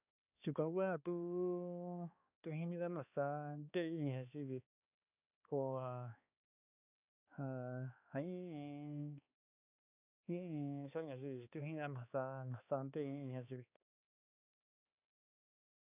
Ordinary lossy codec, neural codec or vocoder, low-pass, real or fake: none; codec, 16 kHz, 2 kbps, X-Codec, HuBERT features, trained on balanced general audio; 3.6 kHz; fake